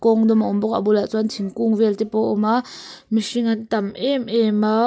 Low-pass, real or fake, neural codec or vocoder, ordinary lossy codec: none; real; none; none